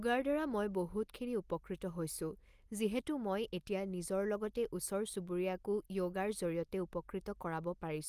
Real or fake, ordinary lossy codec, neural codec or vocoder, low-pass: real; Opus, 32 kbps; none; 14.4 kHz